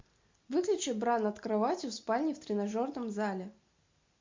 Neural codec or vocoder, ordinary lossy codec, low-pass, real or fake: none; MP3, 48 kbps; 7.2 kHz; real